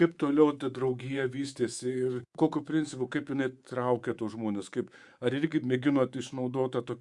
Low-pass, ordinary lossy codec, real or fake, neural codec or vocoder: 10.8 kHz; Opus, 64 kbps; fake; codec, 24 kHz, 3.1 kbps, DualCodec